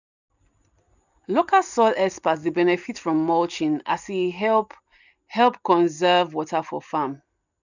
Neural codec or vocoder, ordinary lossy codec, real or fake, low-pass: none; none; real; 7.2 kHz